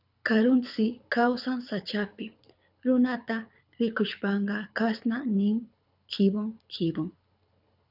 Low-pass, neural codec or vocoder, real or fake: 5.4 kHz; codec, 24 kHz, 6 kbps, HILCodec; fake